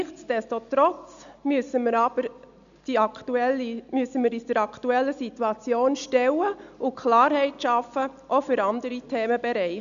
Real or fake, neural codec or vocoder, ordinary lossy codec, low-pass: real; none; none; 7.2 kHz